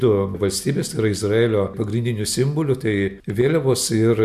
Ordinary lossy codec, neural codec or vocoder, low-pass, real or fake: MP3, 96 kbps; none; 14.4 kHz; real